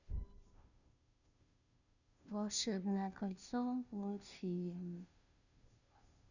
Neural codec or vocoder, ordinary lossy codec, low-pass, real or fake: codec, 16 kHz, 0.5 kbps, FunCodec, trained on Chinese and English, 25 frames a second; none; 7.2 kHz; fake